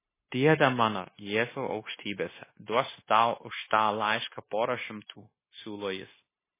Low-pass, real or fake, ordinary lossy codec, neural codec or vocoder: 3.6 kHz; fake; MP3, 16 kbps; codec, 16 kHz, 0.9 kbps, LongCat-Audio-Codec